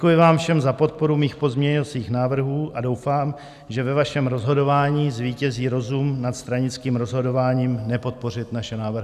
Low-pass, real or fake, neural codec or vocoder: 14.4 kHz; real; none